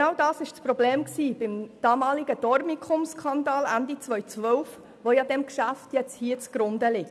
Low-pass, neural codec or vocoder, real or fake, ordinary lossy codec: none; none; real; none